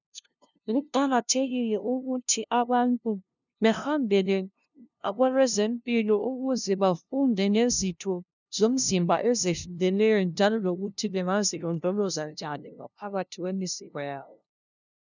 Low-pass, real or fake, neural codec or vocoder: 7.2 kHz; fake; codec, 16 kHz, 0.5 kbps, FunCodec, trained on LibriTTS, 25 frames a second